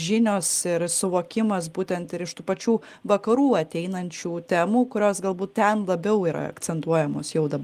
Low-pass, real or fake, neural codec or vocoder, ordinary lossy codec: 14.4 kHz; real; none; Opus, 24 kbps